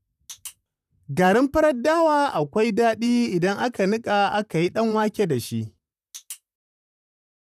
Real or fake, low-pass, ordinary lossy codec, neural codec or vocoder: fake; 14.4 kHz; none; vocoder, 44.1 kHz, 128 mel bands, Pupu-Vocoder